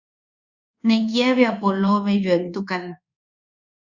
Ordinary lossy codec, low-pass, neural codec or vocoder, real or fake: Opus, 64 kbps; 7.2 kHz; codec, 24 kHz, 1.2 kbps, DualCodec; fake